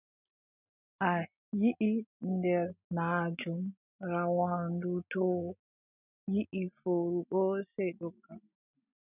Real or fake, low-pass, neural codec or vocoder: real; 3.6 kHz; none